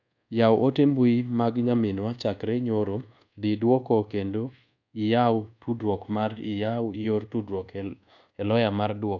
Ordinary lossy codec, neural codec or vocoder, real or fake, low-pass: none; codec, 24 kHz, 1.2 kbps, DualCodec; fake; 7.2 kHz